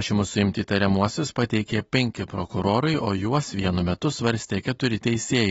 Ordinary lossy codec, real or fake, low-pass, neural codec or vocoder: AAC, 24 kbps; real; 19.8 kHz; none